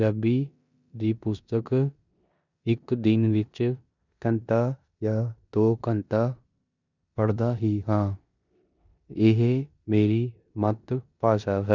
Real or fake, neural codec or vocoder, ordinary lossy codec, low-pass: fake; codec, 16 kHz in and 24 kHz out, 0.9 kbps, LongCat-Audio-Codec, four codebook decoder; none; 7.2 kHz